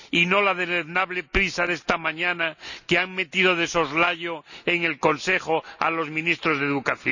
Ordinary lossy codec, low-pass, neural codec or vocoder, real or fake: none; 7.2 kHz; none; real